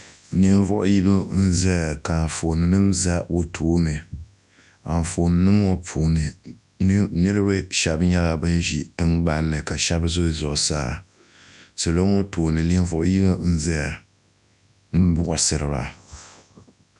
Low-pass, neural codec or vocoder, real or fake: 10.8 kHz; codec, 24 kHz, 0.9 kbps, WavTokenizer, large speech release; fake